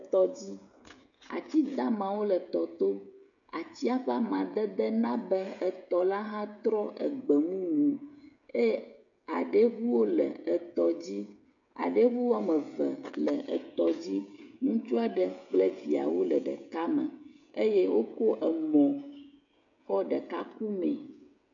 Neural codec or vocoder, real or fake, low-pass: codec, 16 kHz, 16 kbps, FreqCodec, smaller model; fake; 7.2 kHz